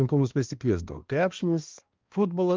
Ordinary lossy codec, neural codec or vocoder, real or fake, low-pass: Opus, 32 kbps; codec, 16 kHz, 1 kbps, X-Codec, HuBERT features, trained on balanced general audio; fake; 7.2 kHz